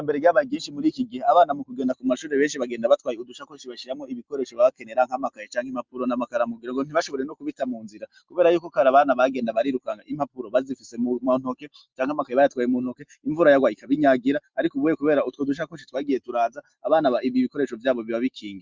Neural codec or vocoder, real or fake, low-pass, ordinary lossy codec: none; real; 7.2 kHz; Opus, 24 kbps